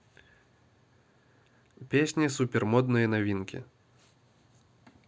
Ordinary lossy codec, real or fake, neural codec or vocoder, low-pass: none; real; none; none